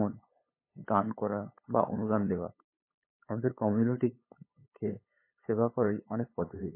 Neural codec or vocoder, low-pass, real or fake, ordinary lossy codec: codec, 16 kHz, 8 kbps, FunCodec, trained on LibriTTS, 25 frames a second; 3.6 kHz; fake; MP3, 16 kbps